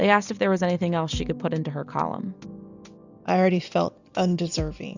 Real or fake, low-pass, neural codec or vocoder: real; 7.2 kHz; none